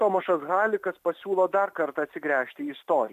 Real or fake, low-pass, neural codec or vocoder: real; 14.4 kHz; none